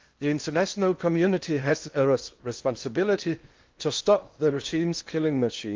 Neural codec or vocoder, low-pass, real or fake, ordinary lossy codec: codec, 16 kHz in and 24 kHz out, 0.8 kbps, FocalCodec, streaming, 65536 codes; 7.2 kHz; fake; Opus, 32 kbps